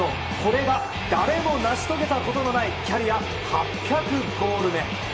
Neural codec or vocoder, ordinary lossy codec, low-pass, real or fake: none; none; none; real